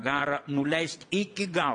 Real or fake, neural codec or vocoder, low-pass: fake; vocoder, 22.05 kHz, 80 mel bands, WaveNeXt; 9.9 kHz